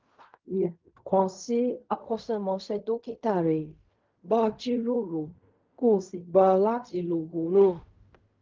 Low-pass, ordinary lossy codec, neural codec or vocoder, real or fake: 7.2 kHz; Opus, 32 kbps; codec, 16 kHz in and 24 kHz out, 0.4 kbps, LongCat-Audio-Codec, fine tuned four codebook decoder; fake